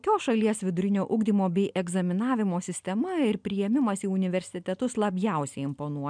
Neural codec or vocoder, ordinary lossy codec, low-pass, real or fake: none; MP3, 96 kbps; 9.9 kHz; real